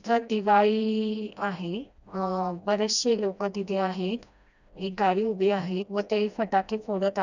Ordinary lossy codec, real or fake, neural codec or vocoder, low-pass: none; fake; codec, 16 kHz, 1 kbps, FreqCodec, smaller model; 7.2 kHz